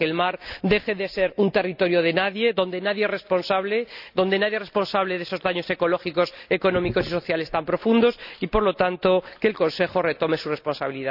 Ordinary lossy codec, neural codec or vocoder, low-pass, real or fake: none; none; 5.4 kHz; real